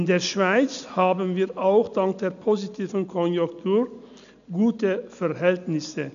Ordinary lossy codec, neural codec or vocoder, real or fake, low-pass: none; none; real; 7.2 kHz